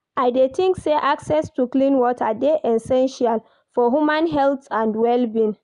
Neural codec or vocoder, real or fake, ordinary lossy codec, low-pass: none; real; none; 10.8 kHz